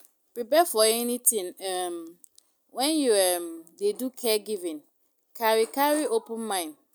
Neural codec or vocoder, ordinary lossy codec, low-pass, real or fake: none; none; none; real